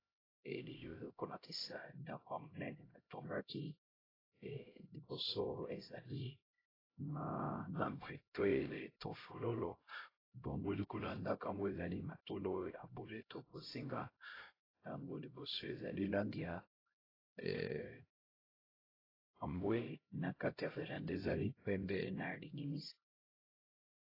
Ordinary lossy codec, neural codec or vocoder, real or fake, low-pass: AAC, 24 kbps; codec, 16 kHz, 0.5 kbps, X-Codec, HuBERT features, trained on LibriSpeech; fake; 5.4 kHz